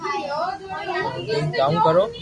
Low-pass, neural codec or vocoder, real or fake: 10.8 kHz; none; real